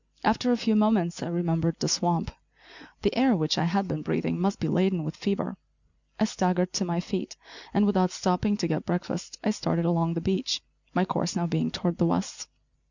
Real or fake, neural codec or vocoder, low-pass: real; none; 7.2 kHz